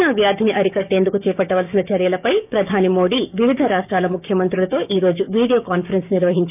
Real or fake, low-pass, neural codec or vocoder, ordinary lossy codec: fake; 3.6 kHz; codec, 16 kHz, 6 kbps, DAC; none